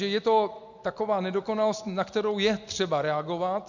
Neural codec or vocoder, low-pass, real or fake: none; 7.2 kHz; real